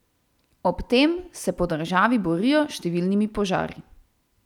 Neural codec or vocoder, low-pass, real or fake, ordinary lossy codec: none; 19.8 kHz; real; none